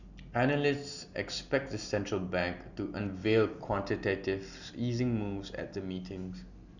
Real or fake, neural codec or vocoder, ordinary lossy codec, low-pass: real; none; none; 7.2 kHz